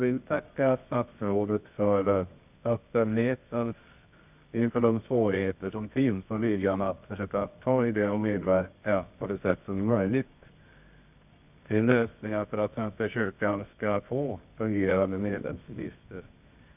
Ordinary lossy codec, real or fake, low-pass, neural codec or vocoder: none; fake; 3.6 kHz; codec, 24 kHz, 0.9 kbps, WavTokenizer, medium music audio release